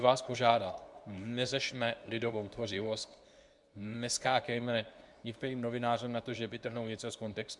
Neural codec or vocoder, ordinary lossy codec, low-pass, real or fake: codec, 24 kHz, 0.9 kbps, WavTokenizer, medium speech release version 2; MP3, 96 kbps; 10.8 kHz; fake